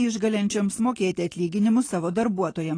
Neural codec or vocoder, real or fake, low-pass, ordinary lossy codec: none; real; 9.9 kHz; AAC, 32 kbps